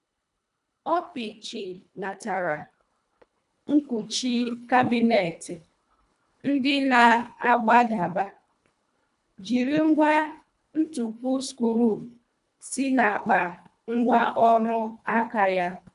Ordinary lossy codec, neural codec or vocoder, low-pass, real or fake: none; codec, 24 kHz, 1.5 kbps, HILCodec; 10.8 kHz; fake